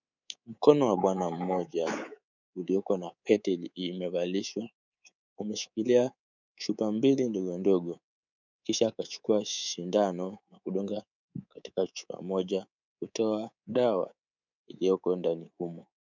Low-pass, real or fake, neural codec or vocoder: 7.2 kHz; fake; codec, 24 kHz, 3.1 kbps, DualCodec